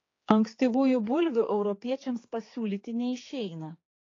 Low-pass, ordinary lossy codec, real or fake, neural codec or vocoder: 7.2 kHz; AAC, 32 kbps; fake; codec, 16 kHz, 4 kbps, X-Codec, HuBERT features, trained on general audio